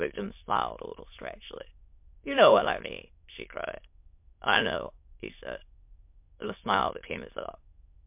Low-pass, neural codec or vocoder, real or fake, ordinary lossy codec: 3.6 kHz; autoencoder, 22.05 kHz, a latent of 192 numbers a frame, VITS, trained on many speakers; fake; MP3, 32 kbps